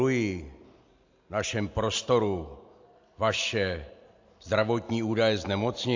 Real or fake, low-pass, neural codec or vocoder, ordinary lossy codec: real; 7.2 kHz; none; AAC, 48 kbps